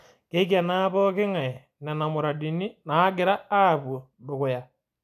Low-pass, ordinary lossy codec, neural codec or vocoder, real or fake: 14.4 kHz; none; none; real